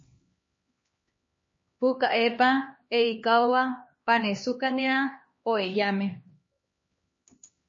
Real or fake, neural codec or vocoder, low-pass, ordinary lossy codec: fake; codec, 16 kHz, 2 kbps, X-Codec, HuBERT features, trained on LibriSpeech; 7.2 kHz; MP3, 32 kbps